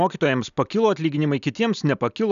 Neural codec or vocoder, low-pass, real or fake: none; 7.2 kHz; real